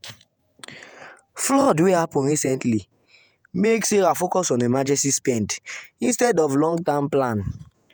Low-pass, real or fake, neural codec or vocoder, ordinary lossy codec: none; fake; vocoder, 48 kHz, 128 mel bands, Vocos; none